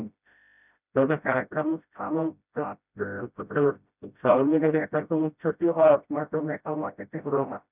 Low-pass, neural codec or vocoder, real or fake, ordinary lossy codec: 3.6 kHz; codec, 16 kHz, 0.5 kbps, FreqCodec, smaller model; fake; none